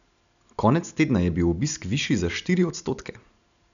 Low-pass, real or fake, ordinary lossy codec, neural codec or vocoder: 7.2 kHz; real; none; none